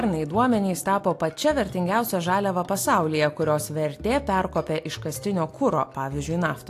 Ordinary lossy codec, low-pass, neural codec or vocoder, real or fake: AAC, 64 kbps; 14.4 kHz; none; real